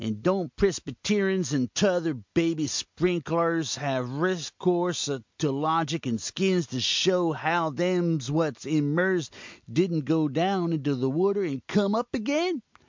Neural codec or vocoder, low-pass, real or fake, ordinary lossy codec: none; 7.2 kHz; real; MP3, 64 kbps